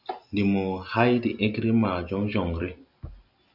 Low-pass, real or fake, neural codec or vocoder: 5.4 kHz; real; none